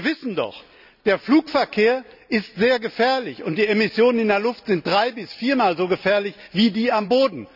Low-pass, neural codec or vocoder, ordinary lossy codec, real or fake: 5.4 kHz; none; none; real